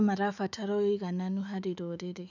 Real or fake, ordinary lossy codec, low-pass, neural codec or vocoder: fake; none; 7.2 kHz; autoencoder, 48 kHz, 128 numbers a frame, DAC-VAE, trained on Japanese speech